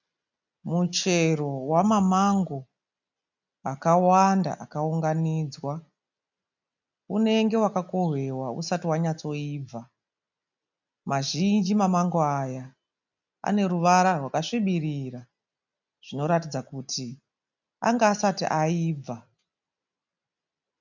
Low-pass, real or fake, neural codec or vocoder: 7.2 kHz; real; none